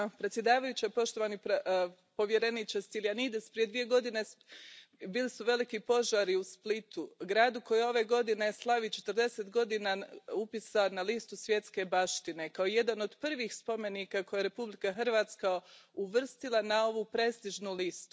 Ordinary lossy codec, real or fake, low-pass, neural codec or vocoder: none; real; none; none